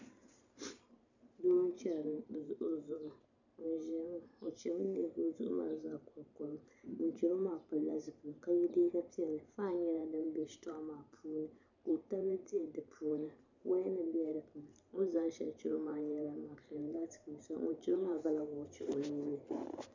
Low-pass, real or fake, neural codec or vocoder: 7.2 kHz; fake; vocoder, 44.1 kHz, 128 mel bands every 512 samples, BigVGAN v2